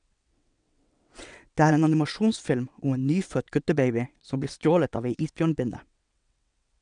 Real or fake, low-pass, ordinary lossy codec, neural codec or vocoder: fake; 9.9 kHz; none; vocoder, 22.05 kHz, 80 mel bands, WaveNeXt